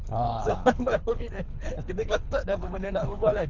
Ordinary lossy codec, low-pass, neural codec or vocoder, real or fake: none; 7.2 kHz; codec, 24 kHz, 3 kbps, HILCodec; fake